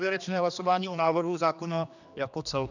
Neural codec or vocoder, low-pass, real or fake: codec, 16 kHz, 2 kbps, X-Codec, HuBERT features, trained on general audio; 7.2 kHz; fake